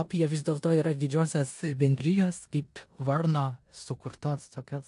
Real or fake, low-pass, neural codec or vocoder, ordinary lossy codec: fake; 10.8 kHz; codec, 16 kHz in and 24 kHz out, 0.9 kbps, LongCat-Audio-Codec, four codebook decoder; AAC, 64 kbps